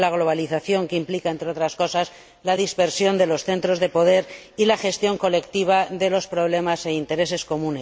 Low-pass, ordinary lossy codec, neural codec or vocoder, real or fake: none; none; none; real